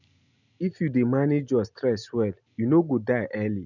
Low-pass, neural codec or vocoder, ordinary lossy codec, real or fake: 7.2 kHz; none; none; real